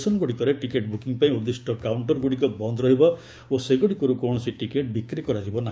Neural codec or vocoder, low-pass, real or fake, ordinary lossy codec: codec, 16 kHz, 6 kbps, DAC; none; fake; none